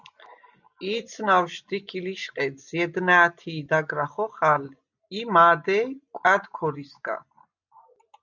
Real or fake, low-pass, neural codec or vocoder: real; 7.2 kHz; none